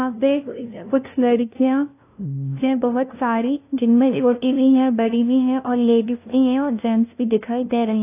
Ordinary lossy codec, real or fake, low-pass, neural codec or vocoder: AAC, 24 kbps; fake; 3.6 kHz; codec, 16 kHz, 0.5 kbps, FunCodec, trained on LibriTTS, 25 frames a second